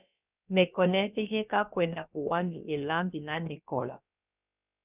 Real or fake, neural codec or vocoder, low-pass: fake; codec, 16 kHz, about 1 kbps, DyCAST, with the encoder's durations; 3.6 kHz